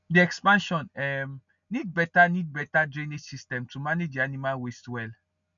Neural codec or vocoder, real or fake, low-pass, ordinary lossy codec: none; real; 7.2 kHz; none